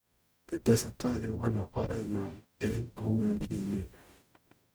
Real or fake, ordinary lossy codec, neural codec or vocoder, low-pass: fake; none; codec, 44.1 kHz, 0.9 kbps, DAC; none